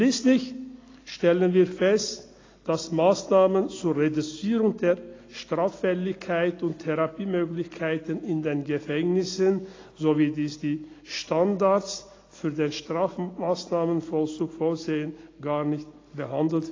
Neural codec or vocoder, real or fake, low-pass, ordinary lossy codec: none; real; 7.2 kHz; AAC, 32 kbps